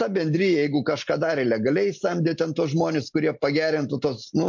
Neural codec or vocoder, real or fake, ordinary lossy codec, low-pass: none; real; MP3, 48 kbps; 7.2 kHz